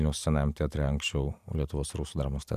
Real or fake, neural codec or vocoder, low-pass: fake; vocoder, 44.1 kHz, 128 mel bands every 512 samples, BigVGAN v2; 14.4 kHz